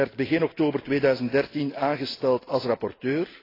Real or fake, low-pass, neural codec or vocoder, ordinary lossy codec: real; 5.4 kHz; none; AAC, 24 kbps